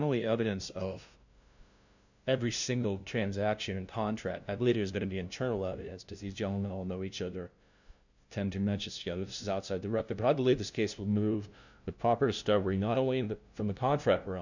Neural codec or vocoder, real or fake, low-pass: codec, 16 kHz, 0.5 kbps, FunCodec, trained on LibriTTS, 25 frames a second; fake; 7.2 kHz